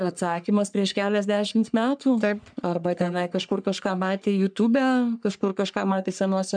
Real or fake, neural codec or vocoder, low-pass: fake; codec, 44.1 kHz, 3.4 kbps, Pupu-Codec; 9.9 kHz